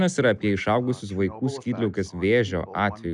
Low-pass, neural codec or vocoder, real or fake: 10.8 kHz; none; real